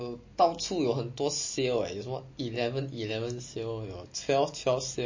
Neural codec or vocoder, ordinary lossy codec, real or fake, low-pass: none; MP3, 32 kbps; real; 7.2 kHz